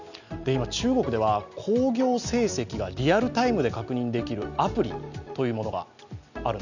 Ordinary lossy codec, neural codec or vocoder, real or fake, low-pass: none; none; real; 7.2 kHz